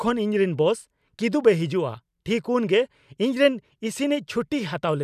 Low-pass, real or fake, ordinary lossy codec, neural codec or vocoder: 14.4 kHz; real; none; none